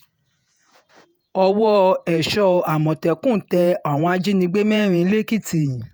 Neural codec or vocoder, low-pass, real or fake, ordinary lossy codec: vocoder, 48 kHz, 128 mel bands, Vocos; none; fake; none